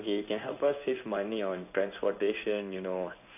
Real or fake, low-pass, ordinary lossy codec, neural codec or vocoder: fake; 3.6 kHz; none; codec, 16 kHz in and 24 kHz out, 1 kbps, XY-Tokenizer